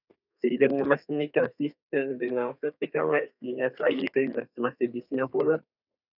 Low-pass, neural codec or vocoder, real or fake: 5.4 kHz; codec, 32 kHz, 1.9 kbps, SNAC; fake